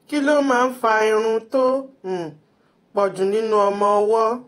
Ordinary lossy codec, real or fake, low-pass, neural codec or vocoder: AAC, 48 kbps; fake; 19.8 kHz; vocoder, 48 kHz, 128 mel bands, Vocos